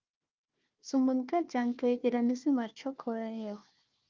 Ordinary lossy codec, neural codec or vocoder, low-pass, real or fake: Opus, 24 kbps; codec, 16 kHz, 1 kbps, FunCodec, trained on Chinese and English, 50 frames a second; 7.2 kHz; fake